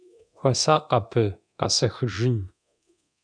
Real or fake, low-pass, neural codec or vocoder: fake; 9.9 kHz; codec, 24 kHz, 0.9 kbps, DualCodec